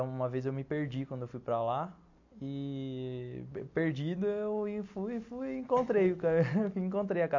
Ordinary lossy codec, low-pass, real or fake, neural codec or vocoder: AAC, 48 kbps; 7.2 kHz; real; none